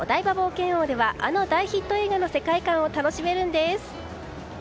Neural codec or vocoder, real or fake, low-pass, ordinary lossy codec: none; real; none; none